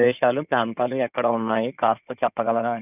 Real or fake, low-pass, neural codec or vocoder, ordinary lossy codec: fake; 3.6 kHz; codec, 16 kHz in and 24 kHz out, 2.2 kbps, FireRedTTS-2 codec; none